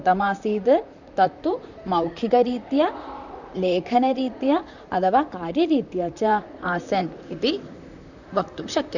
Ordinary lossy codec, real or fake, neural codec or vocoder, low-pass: none; fake; vocoder, 44.1 kHz, 128 mel bands, Pupu-Vocoder; 7.2 kHz